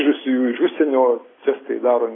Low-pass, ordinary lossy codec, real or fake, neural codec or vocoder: 7.2 kHz; AAC, 16 kbps; real; none